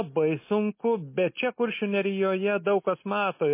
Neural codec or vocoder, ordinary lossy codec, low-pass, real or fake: none; MP3, 24 kbps; 3.6 kHz; real